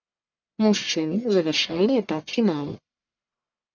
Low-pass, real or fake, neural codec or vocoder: 7.2 kHz; fake; codec, 44.1 kHz, 1.7 kbps, Pupu-Codec